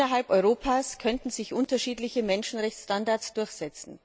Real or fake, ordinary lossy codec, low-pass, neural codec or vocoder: real; none; none; none